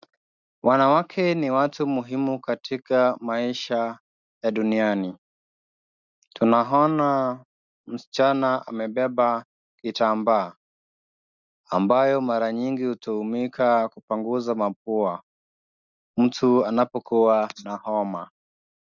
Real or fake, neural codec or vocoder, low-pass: real; none; 7.2 kHz